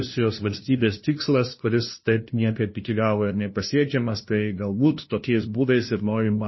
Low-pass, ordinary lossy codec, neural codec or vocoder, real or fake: 7.2 kHz; MP3, 24 kbps; codec, 16 kHz, 0.5 kbps, FunCodec, trained on LibriTTS, 25 frames a second; fake